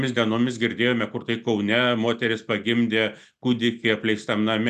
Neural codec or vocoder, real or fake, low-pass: none; real; 14.4 kHz